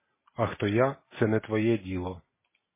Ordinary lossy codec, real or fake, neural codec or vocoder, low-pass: MP3, 16 kbps; real; none; 3.6 kHz